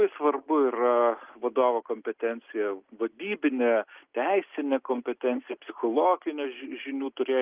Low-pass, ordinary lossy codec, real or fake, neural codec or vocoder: 3.6 kHz; Opus, 32 kbps; real; none